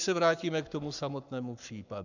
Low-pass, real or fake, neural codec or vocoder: 7.2 kHz; fake; codec, 44.1 kHz, 7.8 kbps, Pupu-Codec